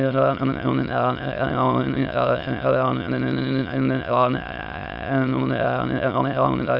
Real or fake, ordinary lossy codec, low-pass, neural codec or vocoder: fake; none; 5.4 kHz; autoencoder, 22.05 kHz, a latent of 192 numbers a frame, VITS, trained on many speakers